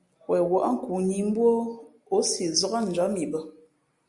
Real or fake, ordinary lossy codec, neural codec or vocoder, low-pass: real; Opus, 64 kbps; none; 10.8 kHz